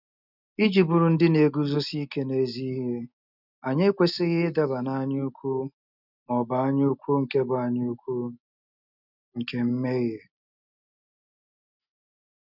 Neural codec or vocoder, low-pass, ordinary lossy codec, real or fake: none; 5.4 kHz; none; real